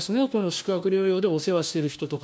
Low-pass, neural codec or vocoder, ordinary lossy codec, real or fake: none; codec, 16 kHz, 1 kbps, FunCodec, trained on LibriTTS, 50 frames a second; none; fake